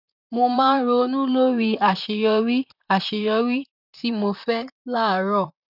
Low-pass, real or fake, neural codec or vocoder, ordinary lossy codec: 5.4 kHz; fake; vocoder, 44.1 kHz, 128 mel bands, Pupu-Vocoder; none